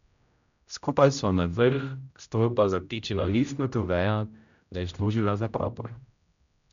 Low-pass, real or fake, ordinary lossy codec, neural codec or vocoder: 7.2 kHz; fake; none; codec, 16 kHz, 0.5 kbps, X-Codec, HuBERT features, trained on general audio